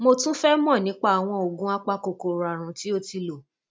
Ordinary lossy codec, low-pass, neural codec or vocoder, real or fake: none; none; none; real